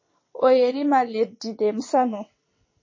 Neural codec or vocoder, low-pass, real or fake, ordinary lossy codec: vocoder, 22.05 kHz, 80 mel bands, WaveNeXt; 7.2 kHz; fake; MP3, 32 kbps